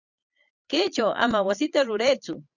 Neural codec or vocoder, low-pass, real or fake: vocoder, 22.05 kHz, 80 mel bands, Vocos; 7.2 kHz; fake